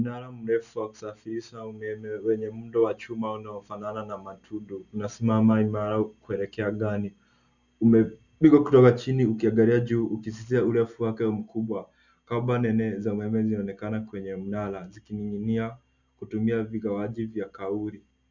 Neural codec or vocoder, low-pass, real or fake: none; 7.2 kHz; real